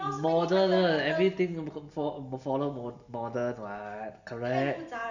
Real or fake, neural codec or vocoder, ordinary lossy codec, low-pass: real; none; none; 7.2 kHz